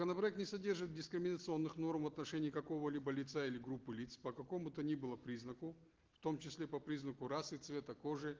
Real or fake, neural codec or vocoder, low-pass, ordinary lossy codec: real; none; 7.2 kHz; Opus, 16 kbps